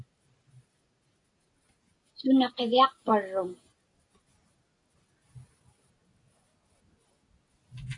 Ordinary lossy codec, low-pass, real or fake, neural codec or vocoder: AAC, 48 kbps; 10.8 kHz; real; none